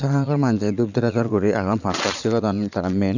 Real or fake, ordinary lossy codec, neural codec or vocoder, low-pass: fake; none; vocoder, 22.05 kHz, 80 mel bands, Vocos; 7.2 kHz